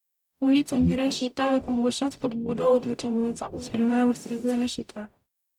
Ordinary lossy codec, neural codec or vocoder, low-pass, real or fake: none; codec, 44.1 kHz, 0.9 kbps, DAC; 19.8 kHz; fake